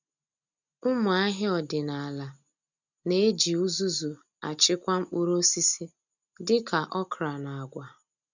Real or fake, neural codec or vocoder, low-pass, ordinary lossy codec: real; none; 7.2 kHz; none